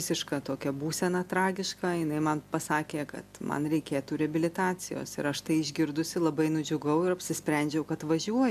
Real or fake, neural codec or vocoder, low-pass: real; none; 14.4 kHz